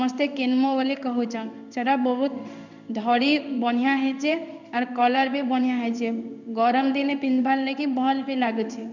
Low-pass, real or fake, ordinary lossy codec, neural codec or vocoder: 7.2 kHz; fake; none; codec, 16 kHz in and 24 kHz out, 1 kbps, XY-Tokenizer